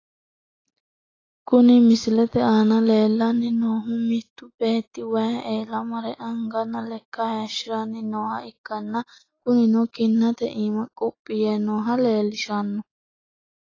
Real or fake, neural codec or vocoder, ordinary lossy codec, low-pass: real; none; AAC, 32 kbps; 7.2 kHz